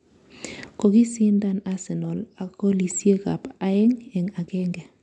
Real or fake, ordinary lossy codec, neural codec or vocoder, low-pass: real; none; none; 10.8 kHz